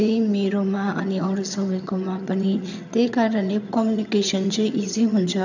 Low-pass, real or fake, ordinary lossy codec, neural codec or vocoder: 7.2 kHz; fake; none; vocoder, 22.05 kHz, 80 mel bands, HiFi-GAN